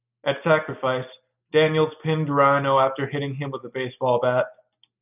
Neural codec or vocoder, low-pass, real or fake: none; 3.6 kHz; real